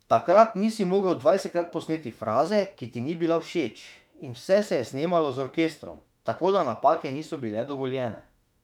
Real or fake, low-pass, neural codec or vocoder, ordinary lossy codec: fake; 19.8 kHz; autoencoder, 48 kHz, 32 numbers a frame, DAC-VAE, trained on Japanese speech; none